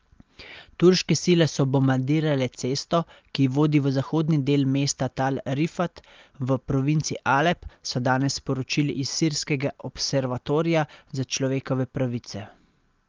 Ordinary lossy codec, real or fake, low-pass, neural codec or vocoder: Opus, 24 kbps; real; 7.2 kHz; none